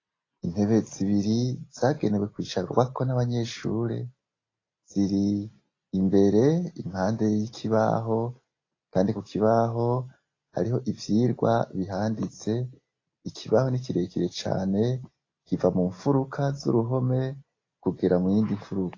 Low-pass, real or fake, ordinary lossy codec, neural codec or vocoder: 7.2 kHz; real; AAC, 32 kbps; none